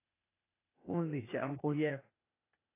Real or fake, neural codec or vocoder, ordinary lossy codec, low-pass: fake; codec, 16 kHz, 0.8 kbps, ZipCodec; AAC, 24 kbps; 3.6 kHz